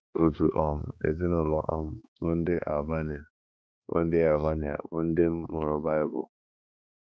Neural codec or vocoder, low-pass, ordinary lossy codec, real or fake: codec, 16 kHz, 2 kbps, X-Codec, HuBERT features, trained on balanced general audio; none; none; fake